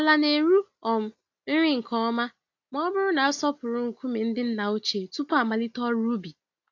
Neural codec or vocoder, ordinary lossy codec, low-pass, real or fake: none; AAC, 48 kbps; 7.2 kHz; real